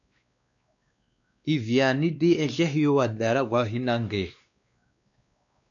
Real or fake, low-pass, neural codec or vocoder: fake; 7.2 kHz; codec, 16 kHz, 2 kbps, X-Codec, WavLM features, trained on Multilingual LibriSpeech